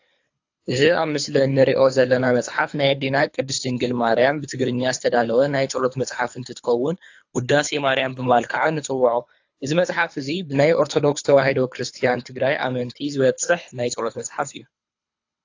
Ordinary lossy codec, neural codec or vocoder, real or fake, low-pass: AAC, 48 kbps; codec, 24 kHz, 3 kbps, HILCodec; fake; 7.2 kHz